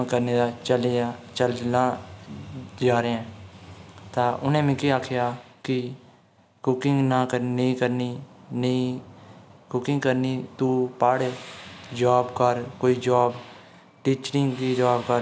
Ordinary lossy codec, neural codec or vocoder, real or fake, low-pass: none; none; real; none